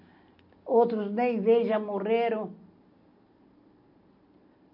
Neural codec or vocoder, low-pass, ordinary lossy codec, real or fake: none; 5.4 kHz; none; real